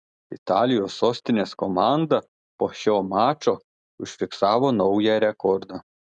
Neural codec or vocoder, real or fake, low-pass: none; real; 9.9 kHz